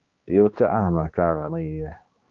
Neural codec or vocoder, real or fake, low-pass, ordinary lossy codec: codec, 16 kHz, 2 kbps, X-Codec, HuBERT features, trained on balanced general audio; fake; 7.2 kHz; Opus, 24 kbps